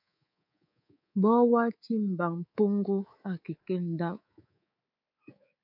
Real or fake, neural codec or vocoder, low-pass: fake; codec, 24 kHz, 3.1 kbps, DualCodec; 5.4 kHz